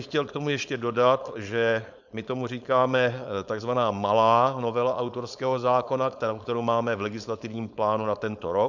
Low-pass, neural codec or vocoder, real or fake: 7.2 kHz; codec, 16 kHz, 4.8 kbps, FACodec; fake